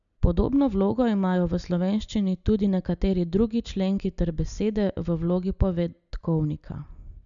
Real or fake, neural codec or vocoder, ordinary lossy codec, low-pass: real; none; none; 7.2 kHz